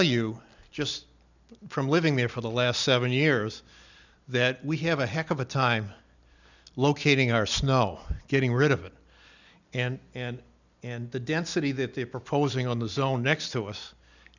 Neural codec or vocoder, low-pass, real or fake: none; 7.2 kHz; real